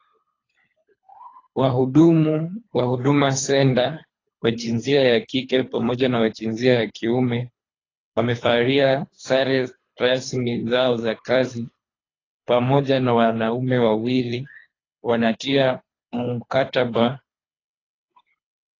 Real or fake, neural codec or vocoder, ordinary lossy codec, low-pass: fake; codec, 24 kHz, 3 kbps, HILCodec; AAC, 32 kbps; 7.2 kHz